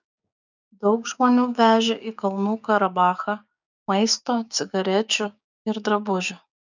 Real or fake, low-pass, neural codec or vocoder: fake; 7.2 kHz; codec, 16 kHz, 6 kbps, DAC